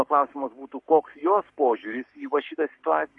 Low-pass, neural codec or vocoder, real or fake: 10.8 kHz; codec, 44.1 kHz, 7.8 kbps, Pupu-Codec; fake